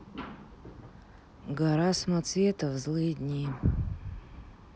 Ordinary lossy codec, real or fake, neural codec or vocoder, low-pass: none; real; none; none